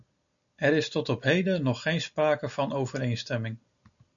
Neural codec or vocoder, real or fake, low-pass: none; real; 7.2 kHz